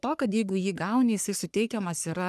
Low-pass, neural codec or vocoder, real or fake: 14.4 kHz; codec, 44.1 kHz, 3.4 kbps, Pupu-Codec; fake